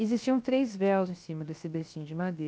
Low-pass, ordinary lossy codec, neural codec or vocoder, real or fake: none; none; codec, 16 kHz, 0.7 kbps, FocalCodec; fake